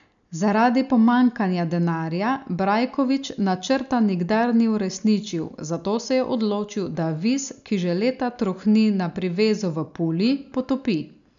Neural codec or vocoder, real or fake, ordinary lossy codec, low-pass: none; real; none; 7.2 kHz